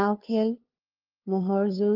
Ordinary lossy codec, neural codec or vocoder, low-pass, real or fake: Opus, 24 kbps; codec, 16 kHz, 2 kbps, FunCodec, trained on LibriTTS, 25 frames a second; 5.4 kHz; fake